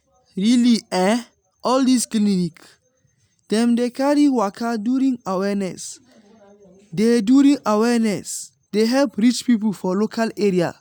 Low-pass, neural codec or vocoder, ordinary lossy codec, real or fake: none; none; none; real